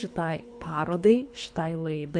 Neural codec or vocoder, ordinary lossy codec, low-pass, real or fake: codec, 24 kHz, 3 kbps, HILCodec; MP3, 64 kbps; 9.9 kHz; fake